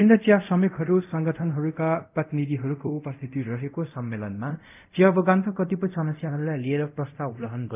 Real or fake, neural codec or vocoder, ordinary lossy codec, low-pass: fake; codec, 24 kHz, 0.5 kbps, DualCodec; none; 3.6 kHz